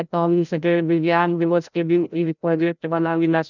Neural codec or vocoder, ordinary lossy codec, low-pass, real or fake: codec, 16 kHz, 0.5 kbps, FreqCodec, larger model; none; 7.2 kHz; fake